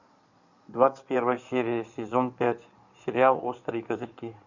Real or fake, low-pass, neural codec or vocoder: fake; 7.2 kHz; codec, 16 kHz in and 24 kHz out, 2.2 kbps, FireRedTTS-2 codec